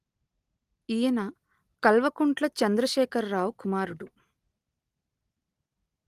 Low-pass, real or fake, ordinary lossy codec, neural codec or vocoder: 14.4 kHz; real; Opus, 24 kbps; none